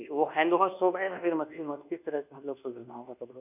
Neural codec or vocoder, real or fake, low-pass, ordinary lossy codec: codec, 24 kHz, 1.2 kbps, DualCodec; fake; 3.6 kHz; none